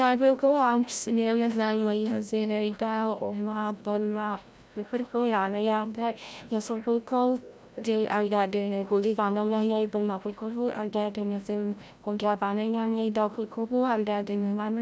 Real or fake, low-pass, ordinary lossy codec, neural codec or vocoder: fake; none; none; codec, 16 kHz, 0.5 kbps, FreqCodec, larger model